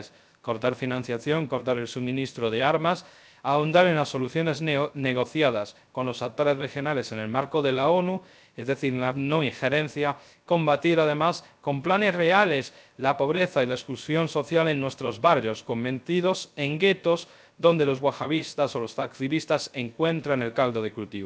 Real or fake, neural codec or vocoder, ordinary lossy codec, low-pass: fake; codec, 16 kHz, 0.3 kbps, FocalCodec; none; none